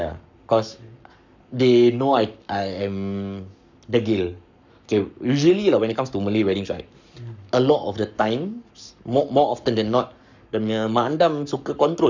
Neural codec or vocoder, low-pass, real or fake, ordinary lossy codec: codec, 44.1 kHz, 7.8 kbps, Pupu-Codec; 7.2 kHz; fake; none